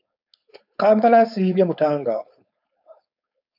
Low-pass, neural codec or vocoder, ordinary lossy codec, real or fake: 5.4 kHz; codec, 16 kHz, 4.8 kbps, FACodec; AAC, 48 kbps; fake